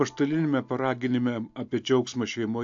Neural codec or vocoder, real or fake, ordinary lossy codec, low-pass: none; real; AAC, 64 kbps; 7.2 kHz